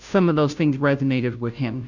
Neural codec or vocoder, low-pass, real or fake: codec, 16 kHz, 0.5 kbps, FunCodec, trained on Chinese and English, 25 frames a second; 7.2 kHz; fake